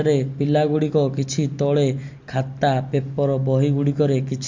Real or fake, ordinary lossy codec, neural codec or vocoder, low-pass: real; MP3, 48 kbps; none; 7.2 kHz